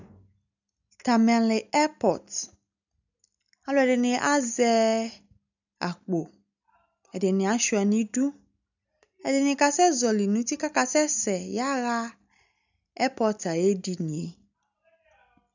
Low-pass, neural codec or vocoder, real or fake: 7.2 kHz; none; real